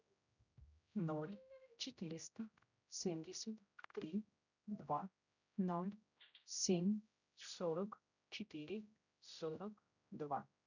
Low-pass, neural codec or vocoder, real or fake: 7.2 kHz; codec, 16 kHz, 0.5 kbps, X-Codec, HuBERT features, trained on general audio; fake